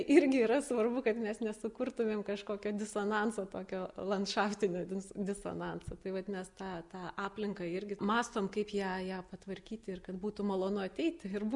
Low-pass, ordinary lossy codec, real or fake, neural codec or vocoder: 10.8 kHz; MP3, 96 kbps; real; none